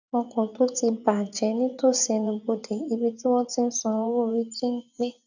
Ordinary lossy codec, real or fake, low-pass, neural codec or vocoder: none; fake; 7.2 kHz; vocoder, 24 kHz, 100 mel bands, Vocos